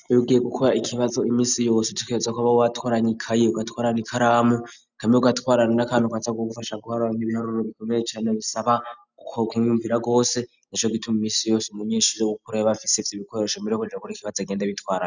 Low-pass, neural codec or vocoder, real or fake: 7.2 kHz; none; real